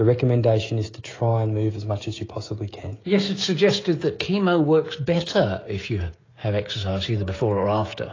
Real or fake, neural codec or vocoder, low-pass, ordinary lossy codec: fake; codec, 16 kHz, 16 kbps, FreqCodec, smaller model; 7.2 kHz; AAC, 32 kbps